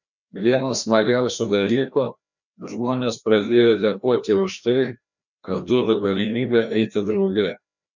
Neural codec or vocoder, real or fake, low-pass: codec, 16 kHz, 1 kbps, FreqCodec, larger model; fake; 7.2 kHz